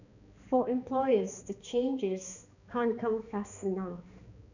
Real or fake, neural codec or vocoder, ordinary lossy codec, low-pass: fake; codec, 16 kHz, 2 kbps, X-Codec, HuBERT features, trained on balanced general audio; none; 7.2 kHz